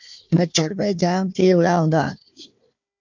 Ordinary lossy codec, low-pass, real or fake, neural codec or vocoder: MP3, 64 kbps; 7.2 kHz; fake; codec, 16 kHz, 1 kbps, FunCodec, trained on Chinese and English, 50 frames a second